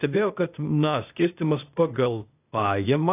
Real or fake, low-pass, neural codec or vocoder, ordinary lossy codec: fake; 3.6 kHz; codec, 16 kHz, 0.8 kbps, ZipCodec; AAC, 24 kbps